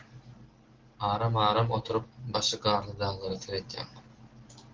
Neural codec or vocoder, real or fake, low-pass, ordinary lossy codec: none; real; 7.2 kHz; Opus, 16 kbps